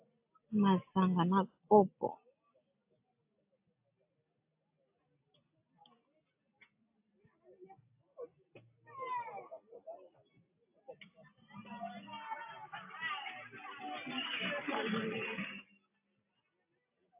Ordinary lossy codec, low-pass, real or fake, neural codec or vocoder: AAC, 24 kbps; 3.6 kHz; real; none